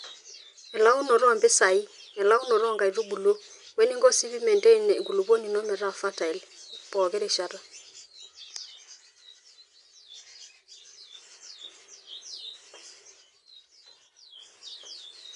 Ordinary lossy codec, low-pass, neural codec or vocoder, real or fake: none; 10.8 kHz; none; real